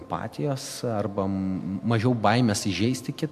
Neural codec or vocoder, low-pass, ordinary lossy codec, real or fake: none; 14.4 kHz; MP3, 96 kbps; real